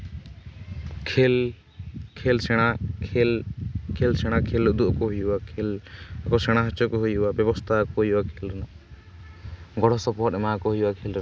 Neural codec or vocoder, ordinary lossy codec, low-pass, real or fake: none; none; none; real